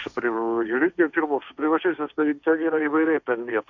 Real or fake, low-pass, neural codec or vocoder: fake; 7.2 kHz; codec, 16 kHz, 1.1 kbps, Voila-Tokenizer